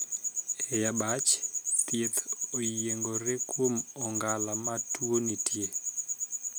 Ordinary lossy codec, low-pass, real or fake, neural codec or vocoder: none; none; real; none